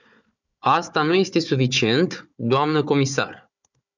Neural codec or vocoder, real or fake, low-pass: codec, 16 kHz, 4 kbps, FunCodec, trained on Chinese and English, 50 frames a second; fake; 7.2 kHz